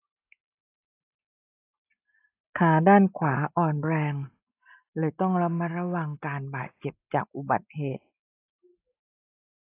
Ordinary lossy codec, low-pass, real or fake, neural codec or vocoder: AAC, 24 kbps; 3.6 kHz; real; none